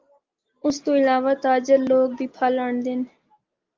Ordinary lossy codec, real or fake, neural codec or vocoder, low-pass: Opus, 32 kbps; real; none; 7.2 kHz